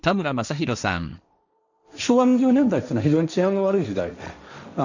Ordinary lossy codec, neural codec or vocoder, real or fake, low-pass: none; codec, 16 kHz, 1.1 kbps, Voila-Tokenizer; fake; 7.2 kHz